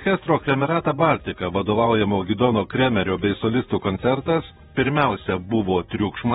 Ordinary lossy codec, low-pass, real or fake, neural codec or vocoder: AAC, 16 kbps; 19.8 kHz; fake; vocoder, 48 kHz, 128 mel bands, Vocos